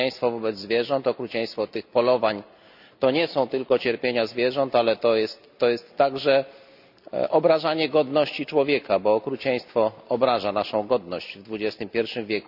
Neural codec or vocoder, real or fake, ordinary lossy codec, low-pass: none; real; none; 5.4 kHz